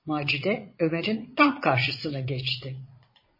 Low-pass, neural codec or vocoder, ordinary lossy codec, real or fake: 5.4 kHz; vocoder, 22.05 kHz, 80 mel bands, Vocos; MP3, 24 kbps; fake